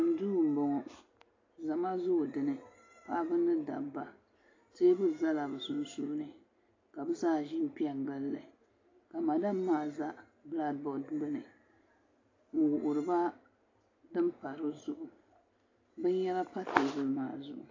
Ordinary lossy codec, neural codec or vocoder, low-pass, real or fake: AAC, 32 kbps; none; 7.2 kHz; real